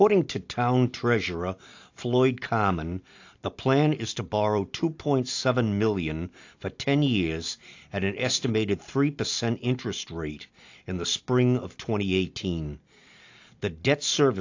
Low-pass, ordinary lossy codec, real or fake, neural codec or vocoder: 7.2 kHz; AAC, 48 kbps; real; none